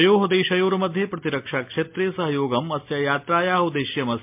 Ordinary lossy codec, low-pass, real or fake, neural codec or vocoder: MP3, 32 kbps; 3.6 kHz; real; none